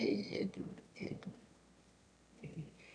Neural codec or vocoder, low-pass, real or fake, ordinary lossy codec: autoencoder, 22.05 kHz, a latent of 192 numbers a frame, VITS, trained on one speaker; 9.9 kHz; fake; none